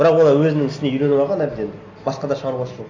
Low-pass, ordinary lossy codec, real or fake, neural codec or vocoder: 7.2 kHz; AAC, 48 kbps; real; none